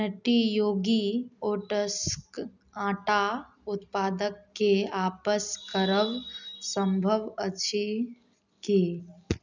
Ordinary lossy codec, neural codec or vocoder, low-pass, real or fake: none; none; 7.2 kHz; real